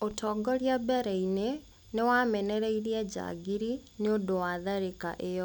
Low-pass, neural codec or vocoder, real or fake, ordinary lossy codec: none; none; real; none